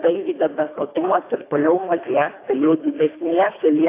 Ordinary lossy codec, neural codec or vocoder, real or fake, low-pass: AAC, 24 kbps; codec, 24 kHz, 1.5 kbps, HILCodec; fake; 3.6 kHz